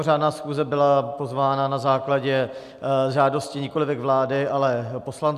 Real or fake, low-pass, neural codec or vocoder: real; 14.4 kHz; none